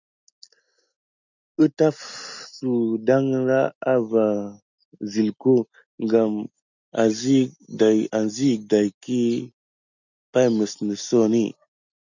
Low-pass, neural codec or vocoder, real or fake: 7.2 kHz; none; real